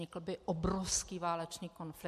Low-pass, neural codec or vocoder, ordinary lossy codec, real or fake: 14.4 kHz; none; MP3, 64 kbps; real